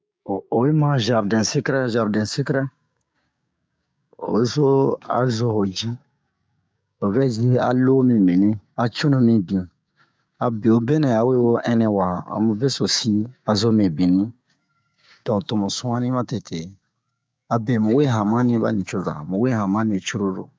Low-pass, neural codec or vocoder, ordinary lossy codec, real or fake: none; codec, 16 kHz, 6 kbps, DAC; none; fake